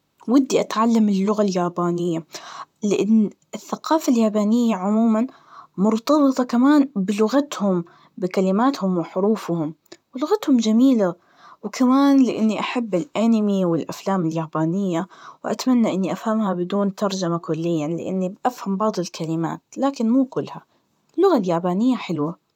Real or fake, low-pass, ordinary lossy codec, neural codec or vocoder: fake; 19.8 kHz; none; vocoder, 44.1 kHz, 128 mel bands, Pupu-Vocoder